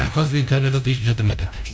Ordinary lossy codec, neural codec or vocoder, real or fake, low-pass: none; codec, 16 kHz, 0.5 kbps, FunCodec, trained on LibriTTS, 25 frames a second; fake; none